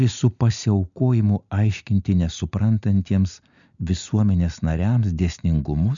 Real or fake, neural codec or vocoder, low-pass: real; none; 7.2 kHz